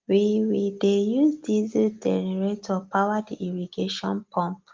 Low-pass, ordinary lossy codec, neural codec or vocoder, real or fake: 7.2 kHz; Opus, 32 kbps; none; real